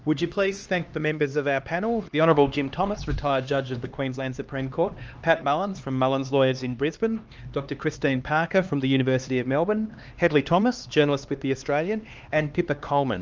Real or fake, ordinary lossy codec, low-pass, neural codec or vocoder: fake; Opus, 24 kbps; 7.2 kHz; codec, 16 kHz, 2 kbps, X-Codec, HuBERT features, trained on LibriSpeech